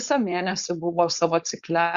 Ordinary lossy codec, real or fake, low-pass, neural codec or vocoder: Opus, 64 kbps; fake; 7.2 kHz; codec, 16 kHz, 4.8 kbps, FACodec